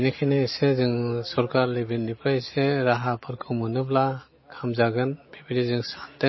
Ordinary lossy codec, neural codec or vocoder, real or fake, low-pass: MP3, 24 kbps; none; real; 7.2 kHz